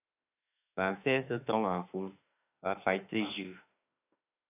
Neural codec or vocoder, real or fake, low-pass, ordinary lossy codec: autoencoder, 48 kHz, 32 numbers a frame, DAC-VAE, trained on Japanese speech; fake; 3.6 kHz; AAC, 32 kbps